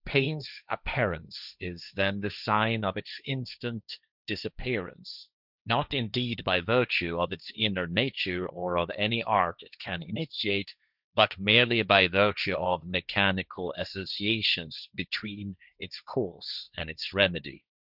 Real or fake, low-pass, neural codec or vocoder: fake; 5.4 kHz; codec, 16 kHz, 1.1 kbps, Voila-Tokenizer